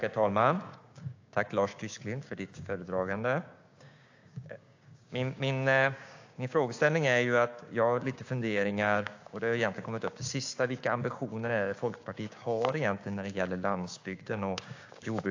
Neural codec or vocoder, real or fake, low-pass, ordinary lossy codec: codec, 16 kHz, 6 kbps, DAC; fake; 7.2 kHz; AAC, 48 kbps